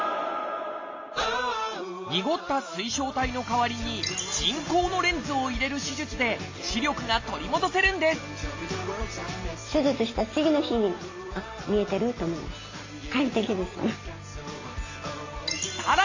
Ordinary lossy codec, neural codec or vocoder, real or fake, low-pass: AAC, 48 kbps; none; real; 7.2 kHz